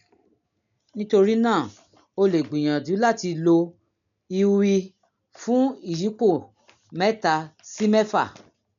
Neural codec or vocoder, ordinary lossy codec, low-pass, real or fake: none; none; 7.2 kHz; real